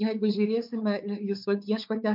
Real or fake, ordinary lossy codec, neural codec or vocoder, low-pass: fake; MP3, 48 kbps; codec, 16 kHz, 4 kbps, X-Codec, WavLM features, trained on Multilingual LibriSpeech; 5.4 kHz